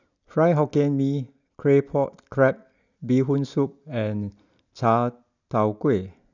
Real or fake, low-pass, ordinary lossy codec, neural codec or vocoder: real; 7.2 kHz; none; none